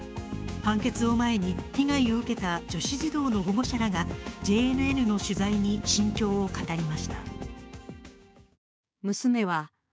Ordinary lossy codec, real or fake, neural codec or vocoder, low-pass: none; fake; codec, 16 kHz, 6 kbps, DAC; none